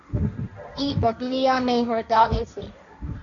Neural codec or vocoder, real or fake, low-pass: codec, 16 kHz, 1.1 kbps, Voila-Tokenizer; fake; 7.2 kHz